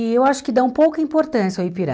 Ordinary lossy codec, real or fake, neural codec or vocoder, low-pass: none; real; none; none